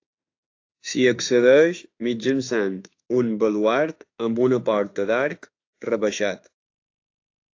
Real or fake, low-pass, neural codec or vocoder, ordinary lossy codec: fake; 7.2 kHz; autoencoder, 48 kHz, 32 numbers a frame, DAC-VAE, trained on Japanese speech; AAC, 48 kbps